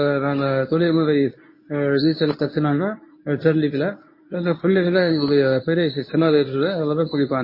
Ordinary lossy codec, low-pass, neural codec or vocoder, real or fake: MP3, 24 kbps; 5.4 kHz; codec, 24 kHz, 0.9 kbps, WavTokenizer, medium speech release version 1; fake